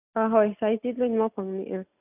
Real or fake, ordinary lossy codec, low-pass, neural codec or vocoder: real; none; 3.6 kHz; none